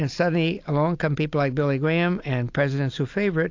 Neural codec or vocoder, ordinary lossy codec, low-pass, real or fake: none; AAC, 48 kbps; 7.2 kHz; real